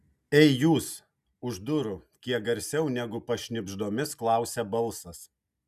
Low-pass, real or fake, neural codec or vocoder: 14.4 kHz; real; none